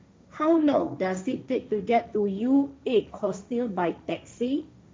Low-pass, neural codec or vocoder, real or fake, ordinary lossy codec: none; codec, 16 kHz, 1.1 kbps, Voila-Tokenizer; fake; none